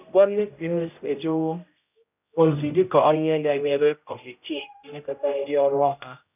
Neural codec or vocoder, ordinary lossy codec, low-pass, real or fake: codec, 16 kHz, 0.5 kbps, X-Codec, HuBERT features, trained on general audio; none; 3.6 kHz; fake